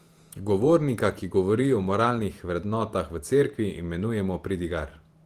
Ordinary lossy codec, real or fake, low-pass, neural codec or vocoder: Opus, 24 kbps; fake; 14.4 kHz; vocoder, 48 kHz, 128 mel bands, Vocos